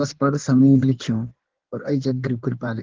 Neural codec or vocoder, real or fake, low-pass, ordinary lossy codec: codec, 16 kHz in and 24 kHz out, 1.1 kbps, FireRedTTS-2 codec; fake; 7.2 kHz; Opus, 16 kbps